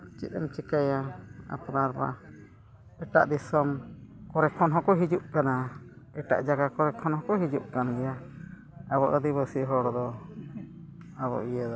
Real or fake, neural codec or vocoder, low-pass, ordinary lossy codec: real; none; none; none